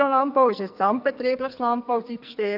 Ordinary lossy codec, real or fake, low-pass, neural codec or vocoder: none; fake; 5.4 kHz; codec, 44.1 kHz, 2.6 kbps, SNAC